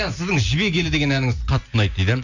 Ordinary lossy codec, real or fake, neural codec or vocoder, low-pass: none; real; none; 7.2 kHz